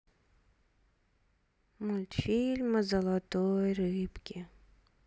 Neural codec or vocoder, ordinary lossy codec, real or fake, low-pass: none; none; real; none